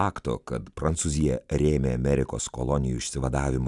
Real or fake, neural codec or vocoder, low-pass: real; none; 10.8 kHz